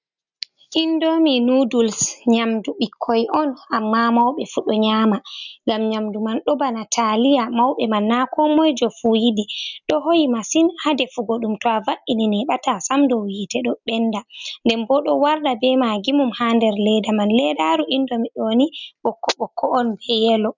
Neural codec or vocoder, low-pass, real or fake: none; 7.2 kHz; real